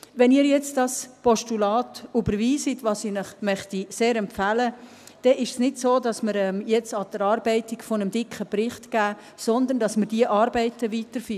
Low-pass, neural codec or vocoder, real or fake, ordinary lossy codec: 14.4 kHz; none; real; none